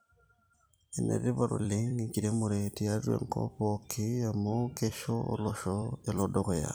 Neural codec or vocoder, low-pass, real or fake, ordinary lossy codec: vocoder, 44.1 kHz, 128 mel bands every 256 samples, BigVGAN v2; none; fake; none